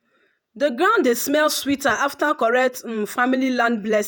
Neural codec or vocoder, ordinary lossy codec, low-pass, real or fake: vocoder, 48 kHz, 128 mel bands, Vocos; none; none; fake